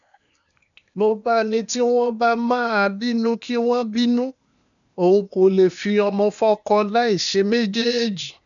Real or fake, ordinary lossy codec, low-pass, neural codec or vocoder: fake; none; 7.2 kHz; codec, 16 kHz, 0.8 kbps, ZipCodec